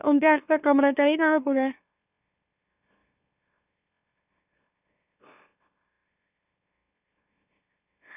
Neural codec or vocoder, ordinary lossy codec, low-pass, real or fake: autoencoder, 44.1 kHz, a latent of 192 numbers a frame, MeloTTS; none; 3.6 kHz; fake